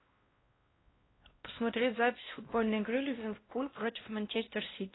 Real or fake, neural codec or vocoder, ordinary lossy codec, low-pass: fake; codec, 16 kHz, 1 kbps, X-Codec, WavLM features, trained on Multilingual LibriSpeech; AAC, 16 kbps; 7.2 kHz